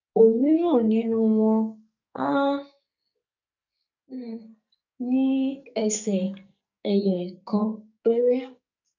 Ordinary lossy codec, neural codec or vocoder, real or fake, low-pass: none; codec, 44.1 kHz, 2.6 kbps, SNAC; fake; 7.2 kHz